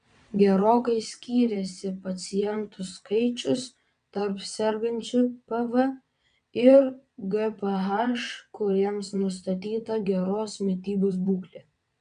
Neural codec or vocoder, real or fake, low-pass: vocoder, 22.05 kHz, 80 mel bands, WaveNeXt; fake; 9.9 kHz